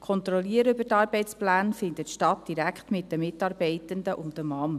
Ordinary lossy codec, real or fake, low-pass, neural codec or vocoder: none; real; 14.4 kHz; none